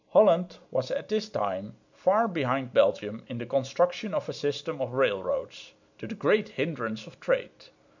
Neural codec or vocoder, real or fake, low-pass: none; real; 7.2 kHz